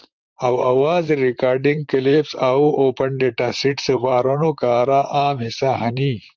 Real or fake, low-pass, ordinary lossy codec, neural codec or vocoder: fake; 7.2 kHz; Opus, 24 kbps; vocoder, 44.1 kHz, 128 mel bands, Pupu-Vocoder